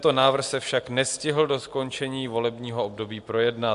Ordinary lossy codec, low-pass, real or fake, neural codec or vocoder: AAC, 64 kbps; 10.8 kHz; real; none